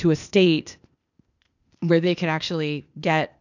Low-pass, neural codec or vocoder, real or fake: 7.2 kHz; codec, 16 kHz, 0.8 kbps, ZipCodec; fake